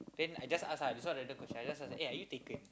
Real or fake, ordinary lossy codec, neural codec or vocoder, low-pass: real; none; none; none